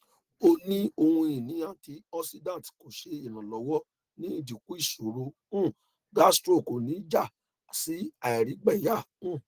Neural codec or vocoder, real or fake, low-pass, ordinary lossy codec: none; real; 14.4 kHz; Opus, 16 kbps